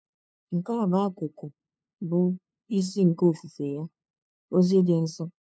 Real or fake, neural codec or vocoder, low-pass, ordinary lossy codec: fake; codec, 16 kHz, 2 kbps, FunCodec, trained on LibriTTS, 25 frames a second; none; none